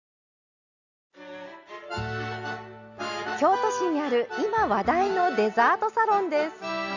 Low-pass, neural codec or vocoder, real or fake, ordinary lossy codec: 7.2 kHz; none; real; none